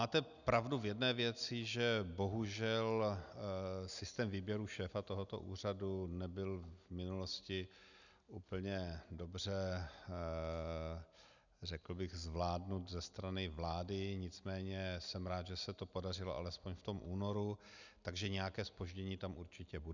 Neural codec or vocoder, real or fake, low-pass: none; real; 7.2 kHz